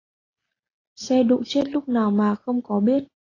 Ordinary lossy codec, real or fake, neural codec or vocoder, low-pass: AAC, 32 kbps; real; none; 7.2 kHz